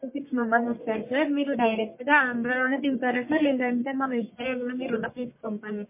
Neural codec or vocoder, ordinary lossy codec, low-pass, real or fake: codec, 44.1 kHz, 1.7 kbps, Pupu-Codec; MP3, 24 kbps; 3.6 kHz; fake